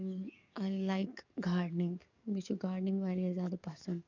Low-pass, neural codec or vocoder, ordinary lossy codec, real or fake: 7.2 kHz; codec, 44.1 kHz, 7.8 kbps, DAC; none; fake